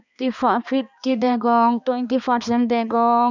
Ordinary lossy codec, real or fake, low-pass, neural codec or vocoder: none; fake; 7.2 kHz; codec, 16 kHz, 2 kbps, X-Codec, HuBERT features, trained on balanced general audio